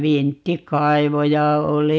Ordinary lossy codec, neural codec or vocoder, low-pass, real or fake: none; none; none; real